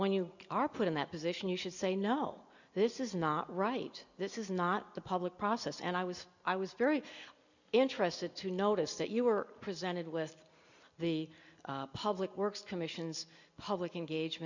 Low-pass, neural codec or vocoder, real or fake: 7.2 kHz; none; real